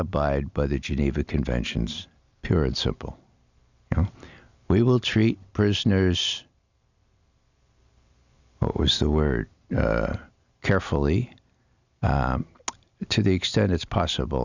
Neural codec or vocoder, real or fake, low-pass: none; real; 7.2 kHz